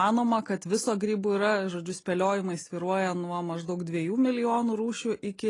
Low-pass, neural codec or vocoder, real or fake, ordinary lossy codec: 10.8 kHz; none; real; AAC, 32 kbps